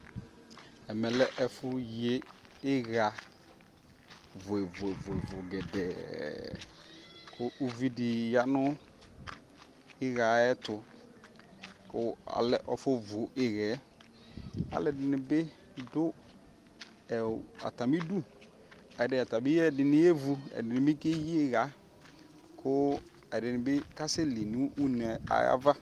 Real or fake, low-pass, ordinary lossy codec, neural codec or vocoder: real; 14.4 kHz; Opus, 24 kbps; none